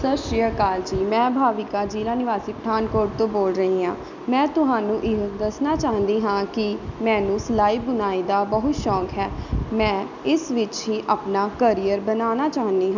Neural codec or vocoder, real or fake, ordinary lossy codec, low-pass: none; real; none; 7.2 kHz